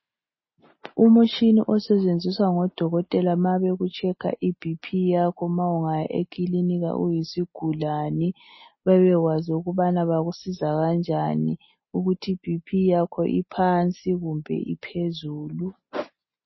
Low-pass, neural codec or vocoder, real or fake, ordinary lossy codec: 7.2 kHz; none; real; MP3, 24 kbps